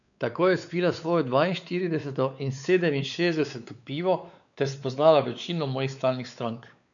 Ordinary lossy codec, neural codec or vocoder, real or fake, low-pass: none; codec, 16 kHz, 4 kbps, X-Codec, WavLM features, trained on Multilingual LibriSpeech; fake; 7.2 kHz